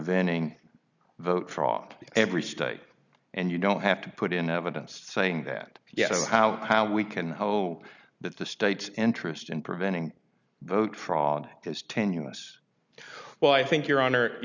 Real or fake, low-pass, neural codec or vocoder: real; 7.2 kHz; none